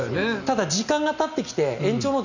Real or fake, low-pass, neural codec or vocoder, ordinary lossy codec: real; 7.2 kHz; none; none